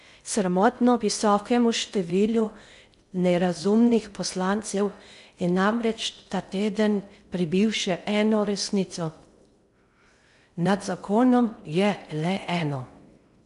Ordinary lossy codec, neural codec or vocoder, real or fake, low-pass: AAC, 96 kbps; codec, 16 kHz in and 24 kHz out, 0.6 kbps, FocalCodec, streaming, 2048 codes; fake; 10.8 kHz